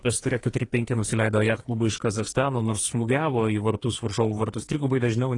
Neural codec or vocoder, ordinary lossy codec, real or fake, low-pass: codec, 44.1 kHz, 2.6 kbps, SNAC; AAC, 32 kbps; fake; 10.8 kHz